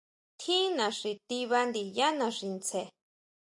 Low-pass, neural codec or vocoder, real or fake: 10.8 kHz; none; real